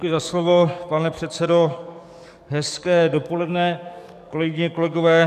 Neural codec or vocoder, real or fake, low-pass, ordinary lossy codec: codec, 44.1 kHz, 7.8 kbps, DAC; fake; 14.4 kHz; AAC, 96 kbps